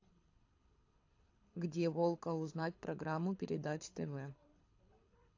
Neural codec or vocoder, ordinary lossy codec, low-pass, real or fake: codec, 24 kHz, 6 kbps, HILCodec; AAC, 48 kbps; 7.2 kHz; fake